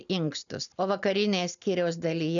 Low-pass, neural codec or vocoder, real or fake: 7.2 kHz; none; real